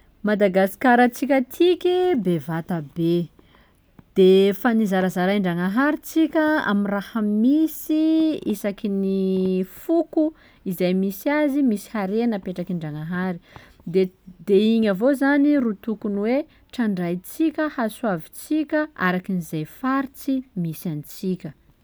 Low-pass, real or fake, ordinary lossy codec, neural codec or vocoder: none; real; none; none